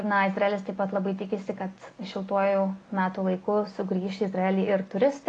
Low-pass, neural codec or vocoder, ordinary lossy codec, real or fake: 9.9 kHz; none; AAC, 32 kbps; real